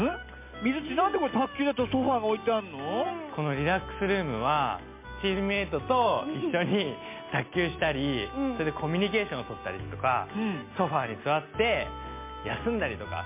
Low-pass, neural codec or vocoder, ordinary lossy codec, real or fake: 3.6 kHz; none; MP3, 24 kbps; real